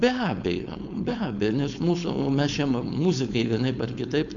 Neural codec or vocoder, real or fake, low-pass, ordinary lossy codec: codec, 16 kHz, 4.8 kbps, FACodec; fake; 7.2 kHz; Opus, 64 kbps